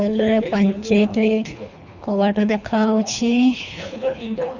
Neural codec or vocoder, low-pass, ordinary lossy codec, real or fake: codec, 24 kHz, 3 kbps, HILCodec; 7.2 kHz; none; fake